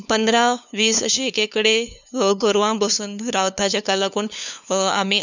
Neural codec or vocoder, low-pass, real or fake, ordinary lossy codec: codec, 16 kHz, 2 kbps, FunCodec, trained on LibriTTS, 25 frames a second; 7.2 kHz; fake; none